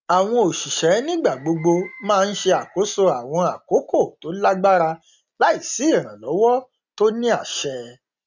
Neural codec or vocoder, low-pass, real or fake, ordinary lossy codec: none; 7.2 kHz; real; none